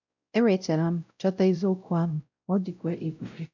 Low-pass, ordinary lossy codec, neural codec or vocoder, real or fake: 7.2 kHz; none; codec, 16 kHz, 0.5 kbps, X-Codec, WavLM features, trained on Multilingual LibriSpeech; fake